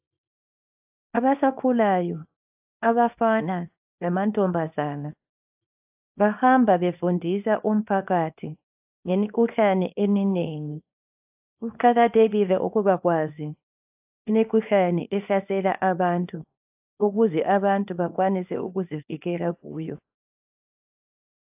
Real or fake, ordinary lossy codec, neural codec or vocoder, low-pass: fake; AAC, 32 kbps; codec, 24 kHz, 0.9 kbps, WavTokenizer, small release; 3.6 kHz